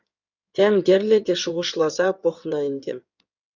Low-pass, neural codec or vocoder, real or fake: 7.2 kHz; codec, 16 kHz in and 24 kHz out, 2.2 kbps, FireRedTTS-2 codec; fake